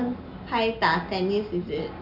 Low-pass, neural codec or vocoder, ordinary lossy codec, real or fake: 5.4 kHz; none; none; real